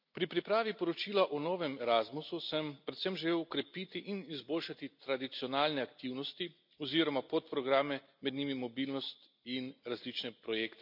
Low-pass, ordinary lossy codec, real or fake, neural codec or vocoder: 5.4 kHz; MP3, 48 kbps; real; none